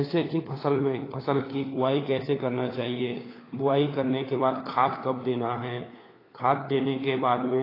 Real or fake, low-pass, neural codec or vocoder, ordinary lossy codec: fake; 5.4 kHz; codec, 16 kHz, 4 kbps, FunCodec, trained on LibriTTS, 50 frames a second; AAC, 24 kbps